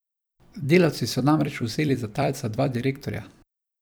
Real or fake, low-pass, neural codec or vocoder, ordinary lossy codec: fake; none; vocoder, 44.1 kHz, 128 mel bands every 256 samples, BigVGAN v2; none